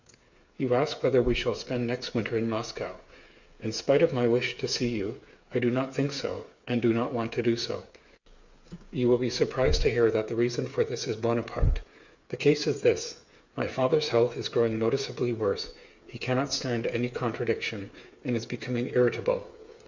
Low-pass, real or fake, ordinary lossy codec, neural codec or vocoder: 7.2 kHz; fake; Opus, 64 kbps; codec, 16 kHz, 8 kbps, FreqCodec, smaller model